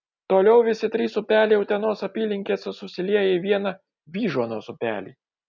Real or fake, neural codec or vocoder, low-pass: real; none; 7.2 kHz